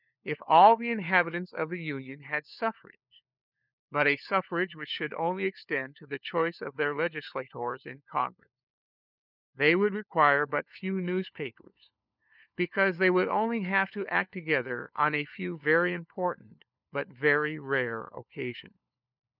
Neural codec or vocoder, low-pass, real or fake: codec, 16 kHz, 4 kbps, FunCodec, trained on LibriTTS, 50 frames a second; 5.4 kHz; fake